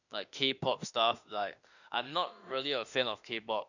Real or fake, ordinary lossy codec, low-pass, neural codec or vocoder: fake; none; 7.2 kHz; autoencoder, 48 kHz, 32 numbers a frame, DAC-VAE, trained on Japanese speech